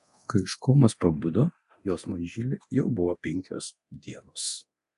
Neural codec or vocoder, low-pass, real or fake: codec, 24 kHz, 0.9 kbps, DualCodec; 10.8 kHz; fake